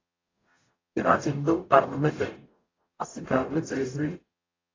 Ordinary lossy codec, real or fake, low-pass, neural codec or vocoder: AAC, 32 kbps; fake; 7.2 kHz; codec, 44.1 kHz, 0.9 kbps, DAC